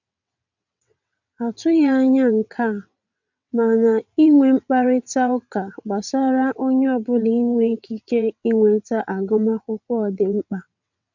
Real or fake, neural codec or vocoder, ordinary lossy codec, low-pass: fake; vocoder, 22.05 kHz, 80 mel bands, WaveNeXt; none; 7.2 kHz